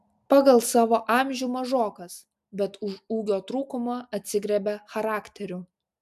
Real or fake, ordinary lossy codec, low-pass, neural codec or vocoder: real; AAC, 96 kbps; 14.4 kHz; none